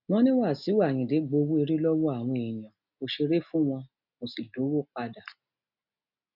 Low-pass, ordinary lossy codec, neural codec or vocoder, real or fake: 5.4 kHz; none; none; real